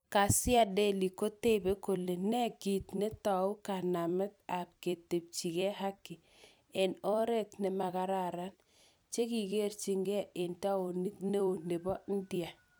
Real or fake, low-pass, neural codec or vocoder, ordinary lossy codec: fake; none; vocoder, 44.1 kHz, 128 mel bands every 256 samples, BigVGAN v2; none